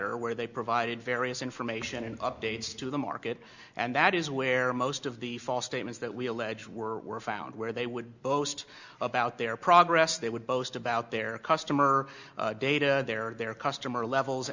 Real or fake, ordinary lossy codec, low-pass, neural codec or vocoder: real; Opus, 64 kbps; 7.2 kHz; none